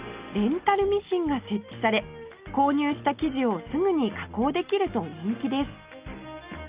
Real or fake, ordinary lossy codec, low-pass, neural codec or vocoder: real; Opus, 24 kbps; 3.6 kHz; none